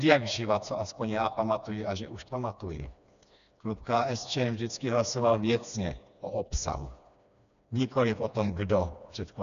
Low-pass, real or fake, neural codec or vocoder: 7.2 kHz; fake; codec, 16 kHz, 2 kbps, FreqCodec, smaller model